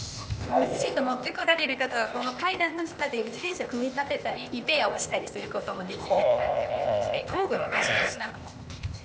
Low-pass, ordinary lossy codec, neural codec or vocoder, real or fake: none; none; codec, 16 kHz, 0.8 kbps, ZipCodec; fake